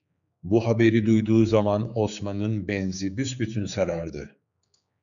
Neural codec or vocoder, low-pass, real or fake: codec, 16 kHz, 4 kbps, X-Codec, HuBERT features, trained on general audio; 7.2 kHz; fake